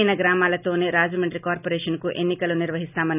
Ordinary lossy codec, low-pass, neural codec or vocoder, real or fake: none; 3.6 kHz; none; real